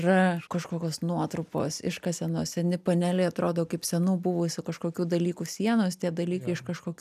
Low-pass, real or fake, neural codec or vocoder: 14.4 kHz; real; none